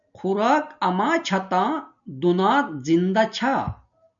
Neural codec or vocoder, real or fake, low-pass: none; real; 7.2 kHz